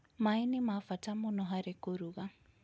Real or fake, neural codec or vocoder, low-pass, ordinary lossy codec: real; none; none; none